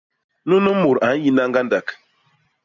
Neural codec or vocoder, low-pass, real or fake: none; 7.2 kHz; real